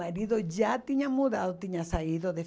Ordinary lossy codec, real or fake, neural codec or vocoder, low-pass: none; real; none; none